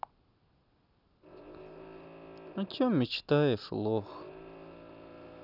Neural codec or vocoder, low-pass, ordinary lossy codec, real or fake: none; 5.4 kHz; none; real